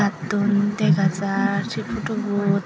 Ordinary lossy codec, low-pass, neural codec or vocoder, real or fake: none; none; none; real